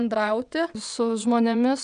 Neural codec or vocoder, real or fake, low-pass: vocoder, 22.05 kHz, 80 mel bands, WaveNeXt; fake; 9.9 kHz